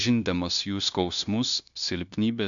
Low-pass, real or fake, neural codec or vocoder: 7.2 kHz; fake; codec, 16 kHz, 0.9 kbps, LongCat-Audio-Codec